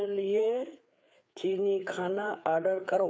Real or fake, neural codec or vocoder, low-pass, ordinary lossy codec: fake; codec, 16 kHz, 4 kbps, FreqCodec, larger model; none; none